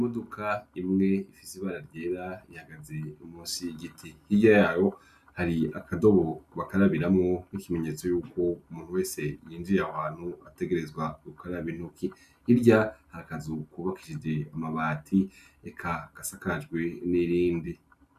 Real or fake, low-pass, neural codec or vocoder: real; 14.4 kHz; none